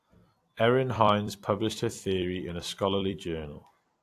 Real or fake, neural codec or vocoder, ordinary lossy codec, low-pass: fake; vocoder, 44.1 kHz, 128 mel bands every 256 samples, BigVGAN v2; AAC, 64 kbps; 14.4 kHz